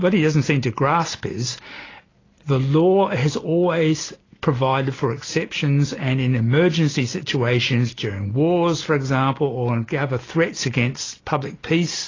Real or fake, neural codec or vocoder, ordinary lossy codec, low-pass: real; none; AAC, 32 kbps; 7.2 kHz